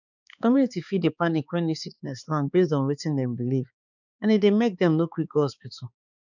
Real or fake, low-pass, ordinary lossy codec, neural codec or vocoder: fake; 7.2 kHz; none; codec, 16 kHz, 4 kbps, X-Codec, HuBERT features, trained on balanced general audio